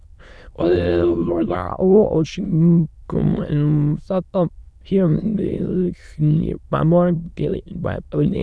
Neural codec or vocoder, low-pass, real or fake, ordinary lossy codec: autoencoder, 22.05 kHz, a latent of 192 numbers a frame, VITS, trained on many speakers; none; fake; none